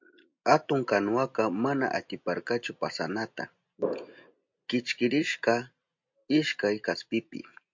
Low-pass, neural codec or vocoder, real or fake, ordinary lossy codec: 7.2 kHz; none; real; MP3, 48 kbps